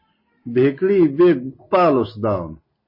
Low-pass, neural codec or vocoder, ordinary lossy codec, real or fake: 5.4 kHz; none; MP3, 24 kbps; real